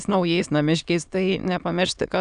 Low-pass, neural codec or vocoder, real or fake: 9.9 kHz; autoencoder, 22.05 kHz, a latent of 192 numbers a frame, VITS, trained on many speakers; fake